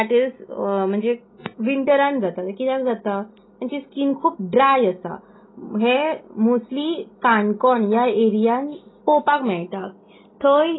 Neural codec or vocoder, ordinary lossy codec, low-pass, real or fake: none; AAC, 16 kbps; 7.2 kHz; real